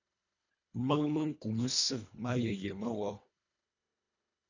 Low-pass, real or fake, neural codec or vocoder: 7.2 kHz; fake; codec, 24 kHz, 1.5 kbps, HILCodec